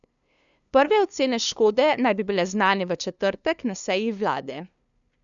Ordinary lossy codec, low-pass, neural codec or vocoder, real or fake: none; 7.2 kHz; codec, 16 kHz, 2 kbps, FunCodec, trained on LibriTTS, 25 frames a second; fake